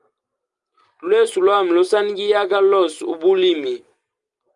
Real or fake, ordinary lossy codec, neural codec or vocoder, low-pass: real; Opus, 32 kbps; none; 10.8 kHz